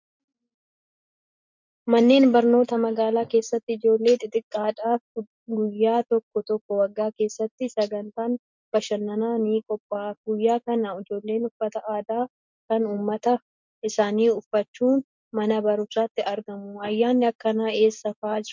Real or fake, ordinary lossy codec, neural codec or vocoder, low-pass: real; MP3, 64 kbps; none; 7.2 kHz